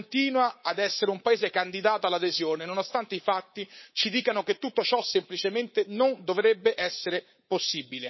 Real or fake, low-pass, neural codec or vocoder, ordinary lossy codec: fake; 7.2 kHz; codec, 24 kHz, 3.1 kbps, DualCodec; MP3, 24 kbps